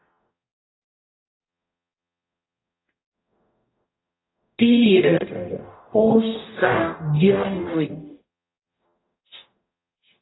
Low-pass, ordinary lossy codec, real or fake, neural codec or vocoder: 7.2 kHz; AAC, 16 kbps; fake; codec, 44.1 kHz, 0.9 kbps, DAC